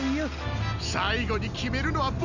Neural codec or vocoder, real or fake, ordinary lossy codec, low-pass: none; real; none; 7.2 kHz